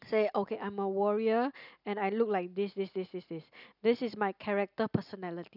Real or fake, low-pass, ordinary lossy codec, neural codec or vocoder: real; 5.4 kHz; none; none